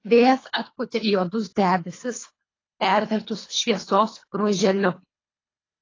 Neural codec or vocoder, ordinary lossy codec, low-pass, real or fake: codec, 24 kHz, 3 kbps, HILCodec; AAC, 32 kbps; 7.2 kHz; fake